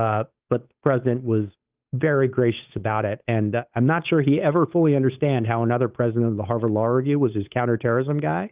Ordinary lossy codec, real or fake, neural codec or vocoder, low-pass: Opus, 32 kbps; fake; codec, 16 kHz, 8 kbps, FunCodec, trained on Chinese and English, 25 frames a second; 3.6 kHz